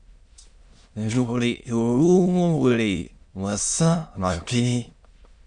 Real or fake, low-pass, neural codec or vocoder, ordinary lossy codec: fake; 9.9 kHz; autoencoder, 22.05 kHz, a latent of 192 numbers a frame, VITS, trained on many speakers; Opus, 64 kbps